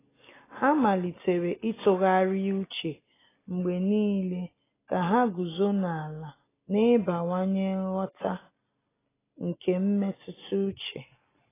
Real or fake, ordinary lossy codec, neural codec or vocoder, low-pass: real; AAC, 16 kbps; none; 3.6 kHz